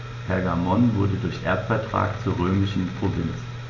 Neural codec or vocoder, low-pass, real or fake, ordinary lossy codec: none; 7.2 kHz; real; MP3, 64 kbps